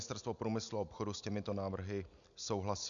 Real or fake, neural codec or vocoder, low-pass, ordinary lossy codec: real; none; 7.2 kHz; MP3, 96 kbps